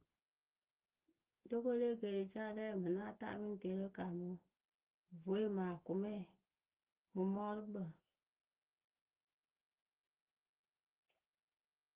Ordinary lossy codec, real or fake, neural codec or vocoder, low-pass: Opus, 16 kbps; real; none; 3.6 kHz